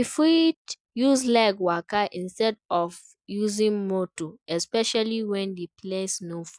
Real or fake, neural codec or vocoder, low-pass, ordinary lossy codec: real; none; 9.9 kHz; none